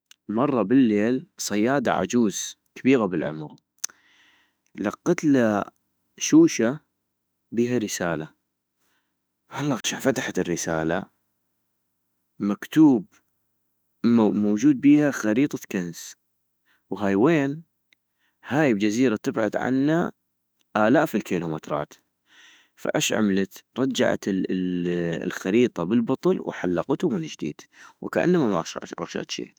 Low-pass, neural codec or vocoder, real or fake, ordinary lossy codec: none; autoencoder, 48 kHz, 32 numbers a frame, DAC-VAE, trained on Japanese speech; fake; none